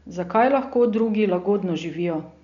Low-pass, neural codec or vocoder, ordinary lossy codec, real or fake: 7.2 kHz; none; none; real